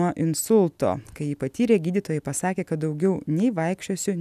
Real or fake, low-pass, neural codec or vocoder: real; 14.4 kHz; none